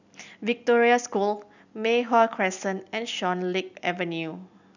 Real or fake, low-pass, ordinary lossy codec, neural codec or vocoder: real; 7.2 kHz; none; none